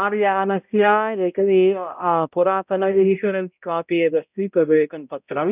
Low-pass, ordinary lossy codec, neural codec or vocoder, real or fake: 3.6 kHz; none; codec, 16 kHz, 0.5 kbps, X-Codec, HuBERT features, trained on balanced general audio; fake